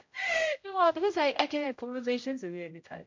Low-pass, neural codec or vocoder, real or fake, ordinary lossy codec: 7.2 kHz; codec, 16 kHz, 0.5 kbps, X-Codec, HuBERT features, trained on general audio; fake; MP3, 48 kbps